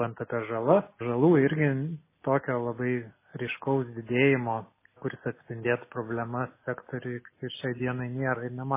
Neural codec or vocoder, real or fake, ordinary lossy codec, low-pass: none; real; MP3, 16 kbps; 3.6 kHz